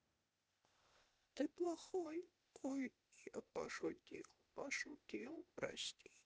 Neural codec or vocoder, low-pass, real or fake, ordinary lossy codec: codec, 16 kHz, 0.8 kbps, ZipCodec; none; fake; none